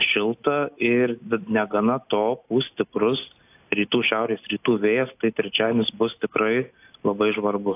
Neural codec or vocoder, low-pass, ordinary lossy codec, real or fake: none; 3.6 kHz; AAC, 32 kbps; real